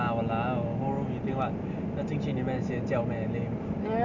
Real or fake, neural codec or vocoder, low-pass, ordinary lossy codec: real; none; 7.2 kHz; none